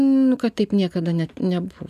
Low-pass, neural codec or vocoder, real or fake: 14.4 kHz; none; real